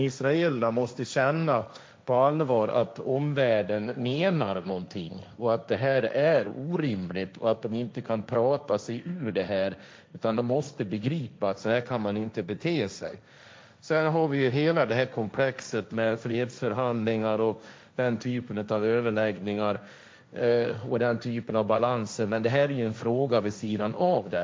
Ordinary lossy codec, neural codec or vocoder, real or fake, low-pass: none; codec, 16 kHz, 1.1 kbps, Voila-Tokenizer; fake; none